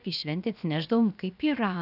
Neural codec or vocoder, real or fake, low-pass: codec, 16 kHz, 0.7 kbps, FocalCodec; fake; 5.4 kHz